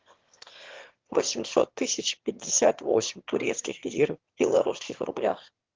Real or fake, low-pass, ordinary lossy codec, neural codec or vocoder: fake; 7.2 kHz; Opus, 16 kbps; autoencoder, 22.05 kHz, a latent of 192 numbers a frame, VITS, trained on one speaker